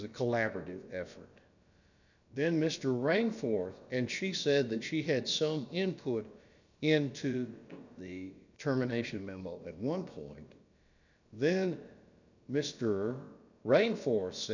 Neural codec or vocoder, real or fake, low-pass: codec, 16 kHz, about 1 kbps, DyCAST, with the encoder's durations; fake; 7.2 kHz